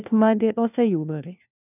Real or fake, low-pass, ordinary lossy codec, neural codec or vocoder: fake; 3.6 kHz; none; codec, 16 kHz, 1 kbps, FunCodec, trained on LibriTTS, 50 frames a second